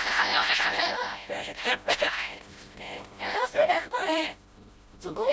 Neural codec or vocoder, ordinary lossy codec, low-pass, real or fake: codec, 16 kHz, 0.5 kbps, FreqCodec, smaller model; none; none; fake